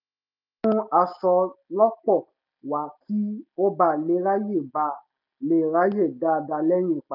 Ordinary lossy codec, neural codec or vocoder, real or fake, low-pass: none; none; real; 5.4 kHz